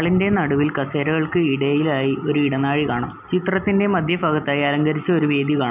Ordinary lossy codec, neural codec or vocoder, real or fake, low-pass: none; none; real; 3.6 kHz